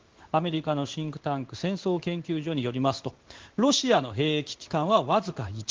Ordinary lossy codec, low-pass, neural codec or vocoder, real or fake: Opus, 16 kbps; 7.2 kHz; codec, 16 kHz in and 24 kHz out, 1 kbps, XY-Tokenizer; fake